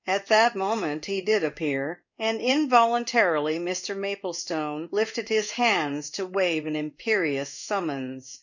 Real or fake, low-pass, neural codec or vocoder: fake; 7.2 kHz; codec, 16 kHz in and 24 kHz out, 1 kbps, XY-Tokenizer